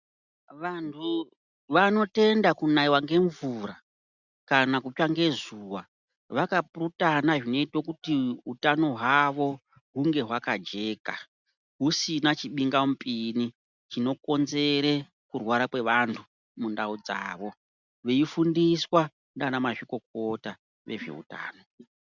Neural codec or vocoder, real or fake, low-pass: none; real; 7.2 kHz